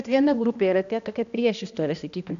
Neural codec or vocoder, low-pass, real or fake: codec, 16 kHz, 1 kbps, X-Codec, HuBERT features, trained on balanced general audio; 7.2 kHz; fake